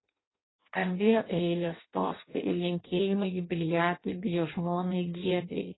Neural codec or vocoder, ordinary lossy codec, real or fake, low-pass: codec, 16 kHz in and 24 kHz out, 0.6 kbps, FireRedTTS-2 codec; AAC, 16 kbps; fake; 7.2 kHz